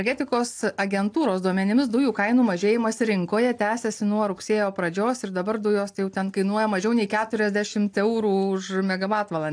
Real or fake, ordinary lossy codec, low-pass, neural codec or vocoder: real; AAC, 64 kbps; 9.9 kHz; none